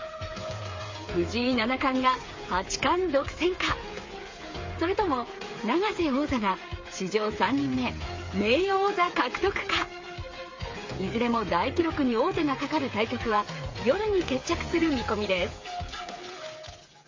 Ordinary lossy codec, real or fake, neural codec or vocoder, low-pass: MP3, 32 kbps; fake; codec, 16 kHz, 8 kbps, FreqCodec, smaller model; 7.2 kHz